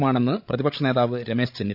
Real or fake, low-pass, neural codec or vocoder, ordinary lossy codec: fake; 5.4 kHz; codec, 16 kHz, 16 kbps, FreqCodec, larger model; none